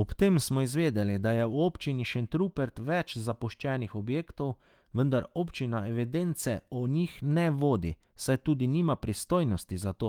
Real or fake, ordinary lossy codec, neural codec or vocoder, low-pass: fake; Opus, 24 kbps; autoencoder, 48 kHz, 128 numbers a frame, DAC-VAE, trained on Japanese speech; 19.8 kHz